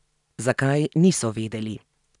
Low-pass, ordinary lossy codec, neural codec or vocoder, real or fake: 10.8 kHz; none; none; real